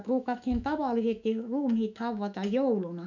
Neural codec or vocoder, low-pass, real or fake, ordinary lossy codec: autoencoder, 48 kHz, 128 numbers a frame, DAC-VAE, trained on Japanese speech; 7.2 kHz; fake; none